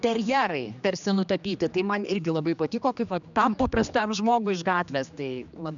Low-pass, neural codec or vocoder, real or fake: 7.2 kHz; codec, 16 kHz, 2 kbps, X-Codec, HuBERT features, trained on general audio; fake